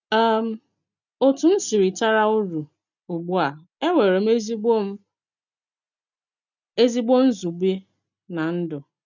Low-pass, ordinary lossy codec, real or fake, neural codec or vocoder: 7.2 kHz; none; real; none